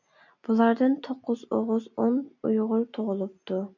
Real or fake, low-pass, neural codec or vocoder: real; 7.2 kHz; none